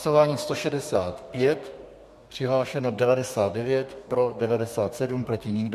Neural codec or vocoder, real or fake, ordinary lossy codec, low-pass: codec, 32 kHz, 1.9 kbps, SNAC; fake; MP3, 64 kbps; 14.4 kHz